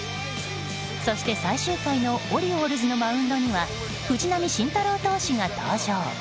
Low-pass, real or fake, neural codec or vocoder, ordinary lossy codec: none; real; none; none